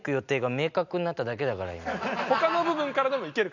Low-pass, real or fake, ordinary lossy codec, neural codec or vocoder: 7.2 kHz; real; none; none